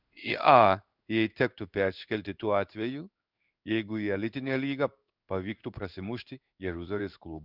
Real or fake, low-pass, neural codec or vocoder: fake; 5.4 kHz; codec, 16 kHz in and 24 kHz out, 1 kbps, XY-Tokenizer